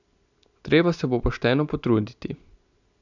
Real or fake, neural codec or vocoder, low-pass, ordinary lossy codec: real; none; 7.2 kHz; none